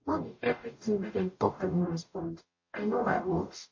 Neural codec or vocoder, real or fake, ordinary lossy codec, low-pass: codec, 44.1 kHz, 0.9 kbps, DAC; fake; MP3, 32 kbps; 7.2 kHz